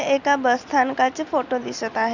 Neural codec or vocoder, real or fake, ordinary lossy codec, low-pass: none; real; none; 7.2 kHz